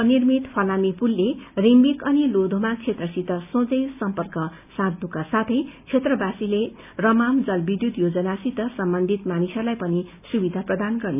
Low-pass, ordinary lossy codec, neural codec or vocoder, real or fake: 3.6 kHz; none; none; real